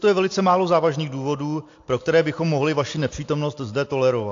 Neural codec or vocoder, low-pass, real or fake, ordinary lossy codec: none; 7.2 kHz; real; AAC, 48 kbps